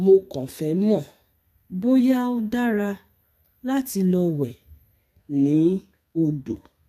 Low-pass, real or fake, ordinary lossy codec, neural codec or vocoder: 14.4 kHz; fake; none; codec, 32 kHz, 1.9 kbps, SNAC